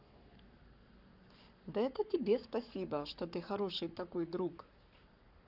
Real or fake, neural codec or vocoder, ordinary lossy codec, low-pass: fake; codec, 44.1 kHz, 7.8 kbps, Pupu-Codec; none; 5.4 kHz